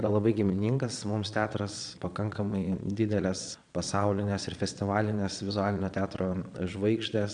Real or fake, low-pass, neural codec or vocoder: fake; 9.9 kHz; vocoder, 22.05 kHz, 80 mel bands, Vocos